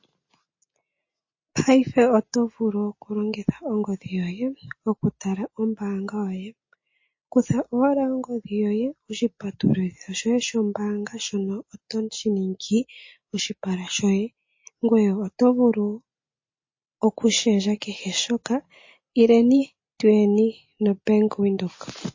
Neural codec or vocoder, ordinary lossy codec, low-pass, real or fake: none; MP3, 32 kbps; 7.2 kHz; real